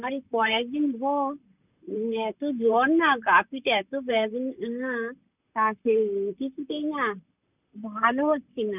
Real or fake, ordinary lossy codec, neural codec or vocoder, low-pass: fake; none; vocoder, 44.1 kHz, 128 mel bands, Pupu-Vocoder; 3.6 kHz